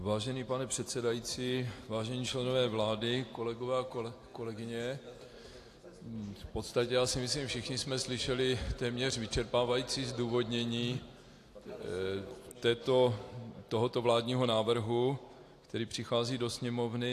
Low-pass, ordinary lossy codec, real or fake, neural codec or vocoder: 14.4 kHz; AAC, 64 kbps; real; none